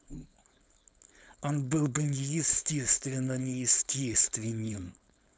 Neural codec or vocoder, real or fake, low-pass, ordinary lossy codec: codec, 16 kHz, 4.8 kbps, FACodec; fake; none; none